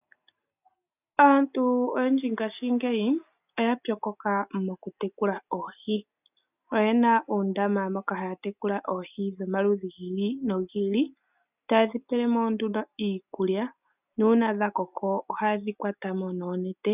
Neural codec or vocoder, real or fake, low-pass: none; real; 3.6 kHz